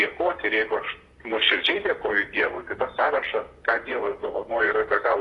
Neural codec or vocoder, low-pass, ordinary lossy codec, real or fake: vocoder, 44.1 kHz, 128 mel bands, Pupu-Vocoder; 10.8 kHz; AAC, 32 kbps; fake